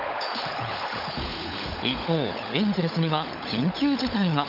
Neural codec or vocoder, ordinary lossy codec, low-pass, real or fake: codec, 16 kHz, 16 kbps, FunCodec, trained on LibriTTS, 50 frames a second; none; 5.4 kHz; fake